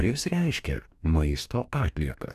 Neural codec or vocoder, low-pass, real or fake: codec, 44.1 kHz, 2.6 kbps, DAC; 14.4 kHz; fake